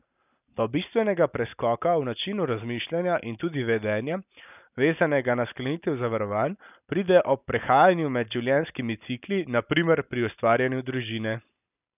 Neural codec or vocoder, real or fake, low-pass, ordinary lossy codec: none; real; 3.6 kHz; none